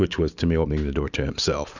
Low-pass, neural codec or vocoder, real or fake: 7.2 kHz; none; real